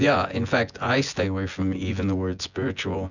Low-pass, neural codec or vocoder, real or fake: 7.2 kHz; vocoder, 24 kHz, 100 mel bands, Vocos; fake